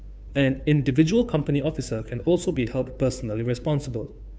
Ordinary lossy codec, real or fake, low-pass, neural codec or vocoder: none; fake; none; codec, 16 kHz, 2 kbps, FunCodec, trained on Chinese and English, 25 frames a second